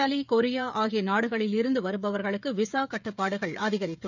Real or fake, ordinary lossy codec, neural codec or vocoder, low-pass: fake; none; codec, 16 kHz, 16 kbps, FreqCodec, smaller model; 7.2 kHz